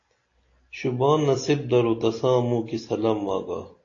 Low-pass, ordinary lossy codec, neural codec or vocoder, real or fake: 7.2 kHz; AAC, 32 kbps; none; real